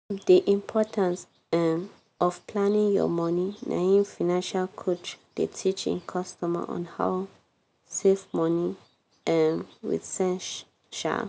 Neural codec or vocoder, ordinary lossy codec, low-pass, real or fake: none; none; none; real